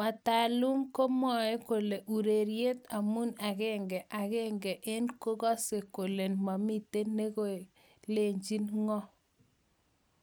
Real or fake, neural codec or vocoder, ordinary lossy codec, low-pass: real; none; none; none